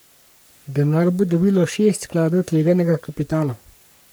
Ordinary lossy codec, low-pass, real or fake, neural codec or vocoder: none; none; fake; codec, 44.1 kHz, 3.4 kbps, Pupu-Codec